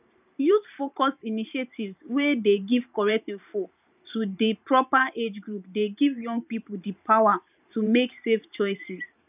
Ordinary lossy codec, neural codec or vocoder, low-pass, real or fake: none; vocoder, 44.1 kHz, 128 mel bands every 512 samples, BigVGAN v2; 3.6 kHz; fake